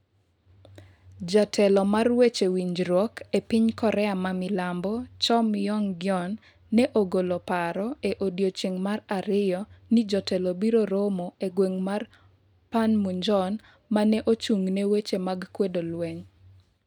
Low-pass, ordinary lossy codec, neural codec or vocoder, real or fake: 19.8 kHz; none; none; real